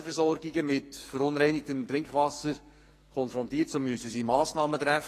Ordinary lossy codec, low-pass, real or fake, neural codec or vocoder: AAC, 48 kbps; 14.4 kHz; fake; codec, 44.1 kHz, 2.6 kbps, SNAC